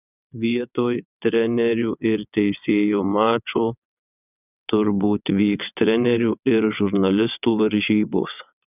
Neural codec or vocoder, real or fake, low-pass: vocoder, 22.05 kHz, 80 mel bands, WaveNeXt; fake; 3.6 kHz